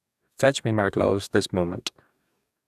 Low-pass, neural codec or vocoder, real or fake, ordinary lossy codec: 14.4 kHz; codec, 44.1 kHz, 2.6 kbps, DAC; fake; none